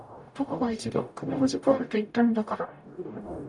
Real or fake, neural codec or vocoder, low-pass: fake; codec, 44.1 kHz, 0.9 kbps, DAC; 10.8 kHz